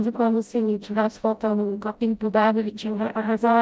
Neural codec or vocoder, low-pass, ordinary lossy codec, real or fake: codec, 16 kHz, 0.5 kbps, FreqCodec, smaller model; none; none; fake